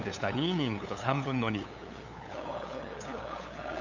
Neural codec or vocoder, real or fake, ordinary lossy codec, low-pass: codec, 16 kHz, 8 kbps, FunCodec, trained on LibriTTS, 25 frames a second; fake; none; 7.2 kHz